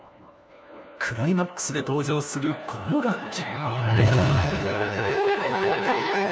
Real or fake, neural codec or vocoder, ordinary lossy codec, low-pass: fake; codec, 16 kHz, 1 kbps, FunCodec, trained on LibriTTS, 50 frames a second; none; none